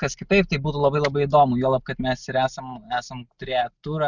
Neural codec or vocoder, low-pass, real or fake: none; 7.2 kHz; real